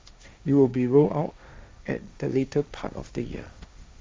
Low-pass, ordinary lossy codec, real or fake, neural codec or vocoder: none; none; fake; codec, 16 kHz, 1.1 kbps, Voila-Tokenizer